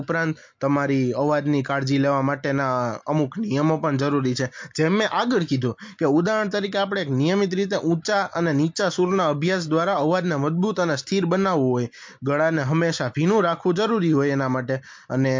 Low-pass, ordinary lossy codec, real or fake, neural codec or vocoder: 7.2 kHz; MP3, 48 kbps; real; none